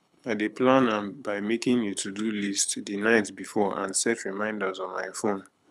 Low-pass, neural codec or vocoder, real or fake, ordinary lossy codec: none; codec, 24 kHz, 6 kbps, HILCodec; fake; none